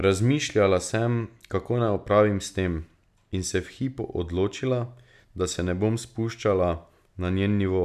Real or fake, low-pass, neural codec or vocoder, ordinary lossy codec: real; 14.4 kHz; none; none